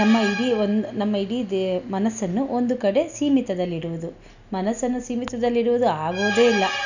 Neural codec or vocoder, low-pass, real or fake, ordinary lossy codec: none; 7.2 kHz; real; none